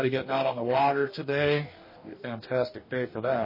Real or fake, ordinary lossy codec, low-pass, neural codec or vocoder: fake; MP3, 24 kbps; 5.4 kHz; codec, 44.1 kHz, 2.6 kbps, DAC